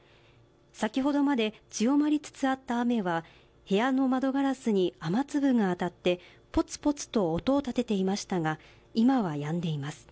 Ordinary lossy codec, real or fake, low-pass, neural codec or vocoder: none; real; none; none